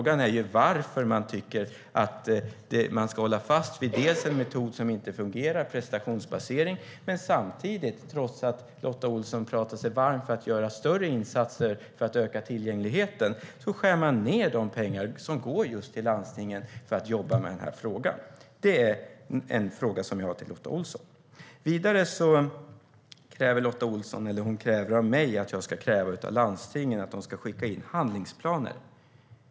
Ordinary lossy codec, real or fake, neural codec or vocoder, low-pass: none; real; none; none